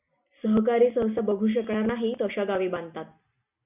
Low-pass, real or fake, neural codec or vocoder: 3.6 kHz; real; none